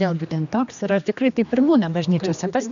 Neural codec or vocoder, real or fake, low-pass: codec, 16 kHz, 2 kbps, X-Codec, HuBERT features, trained on general audio; fake; 7.2 kHz